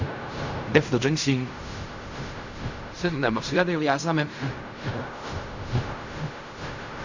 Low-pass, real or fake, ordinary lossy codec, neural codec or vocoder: 7.2 kHz; fake; Opus, 64 kbps; codec, 16 kHz in and 24 kHz out, 0.4 kbps, LongCat-Audio-Codec, fine tuned four codebook decoder